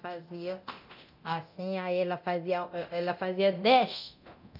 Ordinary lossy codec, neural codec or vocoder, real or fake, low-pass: none; codec, 24 kHz, 0.9 kbps, DualCodec; fake; 5.4 kHz